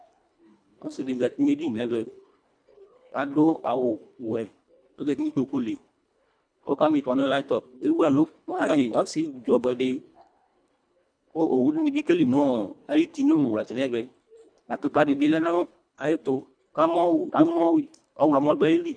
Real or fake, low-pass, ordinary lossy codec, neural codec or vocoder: fake; 9.9 kHz; MP3, 96 kbps; codec, 24 kHz, 1.5 kbps, HILCodec